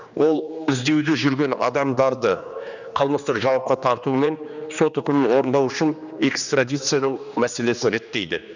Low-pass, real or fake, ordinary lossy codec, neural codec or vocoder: 7.2 kHz; fake; none; codec, 16 kHz, 2 kbps, X-Codec, HuBERT features, trained on general audio